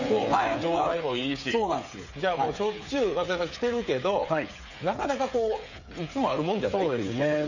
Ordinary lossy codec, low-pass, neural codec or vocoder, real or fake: none; 7.2 kHz; codec, 16 kHz, 8 kbps, FreqCodec, smaller model; fake